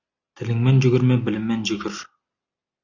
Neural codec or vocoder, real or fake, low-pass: none; real; 7.2 kHz